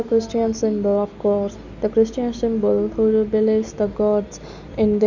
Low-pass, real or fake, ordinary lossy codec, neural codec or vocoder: 7.2 kHz; real; none; none